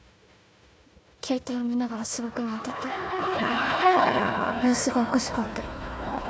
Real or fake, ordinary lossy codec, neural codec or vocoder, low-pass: fake; none; codec, 16 kHz, 1 kbps, FunCodec, trained on Chinese and English, 50 frames a second; none